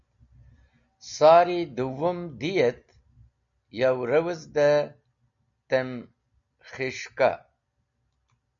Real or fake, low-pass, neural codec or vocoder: real; 7.2 kHz; none